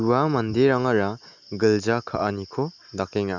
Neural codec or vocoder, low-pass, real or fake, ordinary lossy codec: none; 7.2 kHz; real; none